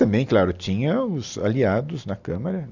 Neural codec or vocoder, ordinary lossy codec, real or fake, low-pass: none; none; real; 7.2 kHz